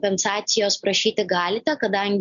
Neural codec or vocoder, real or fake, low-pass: none; real; 7.2 kHz